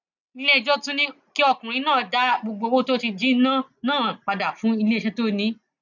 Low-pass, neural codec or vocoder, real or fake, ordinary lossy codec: 7.2 kHz; none; real; none